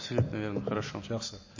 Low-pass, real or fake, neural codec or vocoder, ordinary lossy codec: 7.2 kHz; real; none; MP3, 32 kbps